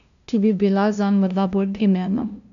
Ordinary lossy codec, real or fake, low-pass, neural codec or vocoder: none; fake; 7.2 kHz; codec, 16 kHz, 0.5 kbps, FunCodec, trained on LibriTTS, 25 frames a second